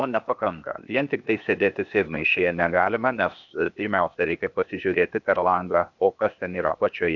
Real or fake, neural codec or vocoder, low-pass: fake; codec, 16 kHz, 0.8 kbps, ZipCodec; 7.2 kHz